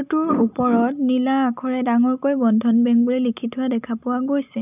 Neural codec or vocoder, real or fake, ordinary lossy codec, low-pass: none; real; none; 3.6 kHz